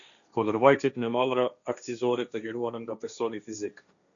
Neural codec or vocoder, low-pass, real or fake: codec, 16 kHz, 1.1 kbps, Voila-Tokenizer; 7.2 kHz; fake